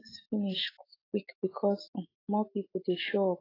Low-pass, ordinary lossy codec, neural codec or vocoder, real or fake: 5.4 kHz; AAC, 24 kbps; autoencoder, 48 kHz, 128 numbers a frame, DAC-VAE, trained on Japanese speech; fake